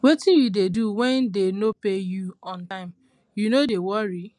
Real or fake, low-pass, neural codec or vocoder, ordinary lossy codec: real; 10.8 kHz; none; none